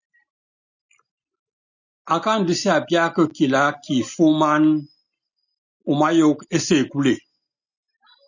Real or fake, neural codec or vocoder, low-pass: real; none; 7.2 kHz